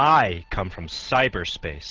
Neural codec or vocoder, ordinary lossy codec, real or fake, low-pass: none; Opus, 16 kbps; real; 7.2 kHz